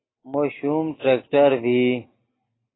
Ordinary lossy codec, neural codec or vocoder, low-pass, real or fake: AAC, 16 kbps; none; 7.2 kHz; real